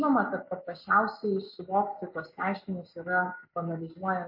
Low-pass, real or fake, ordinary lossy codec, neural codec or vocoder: 5.4 kHz; real; MP3, 32 kbps; none